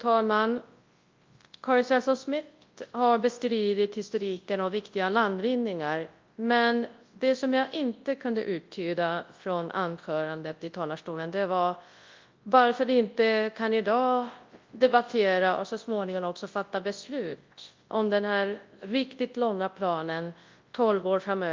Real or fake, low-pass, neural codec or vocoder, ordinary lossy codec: fake; 7.2 kHz; codec, 24 kHz, 0.9 kbps, WavTokenizer, large speech release; Opus, 32 kbps